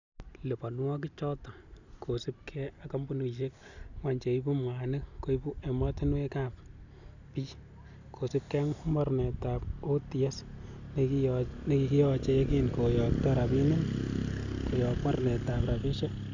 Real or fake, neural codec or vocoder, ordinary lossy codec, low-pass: real; none; none; 7.2 kHz